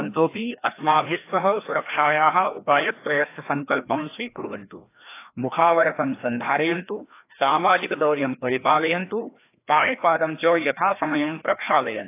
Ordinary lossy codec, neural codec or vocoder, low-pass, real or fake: AAC, 24 kbps; codec, 16 kHz, 1 kbps, FreqCodec, larger model; 3.6 kHz; fake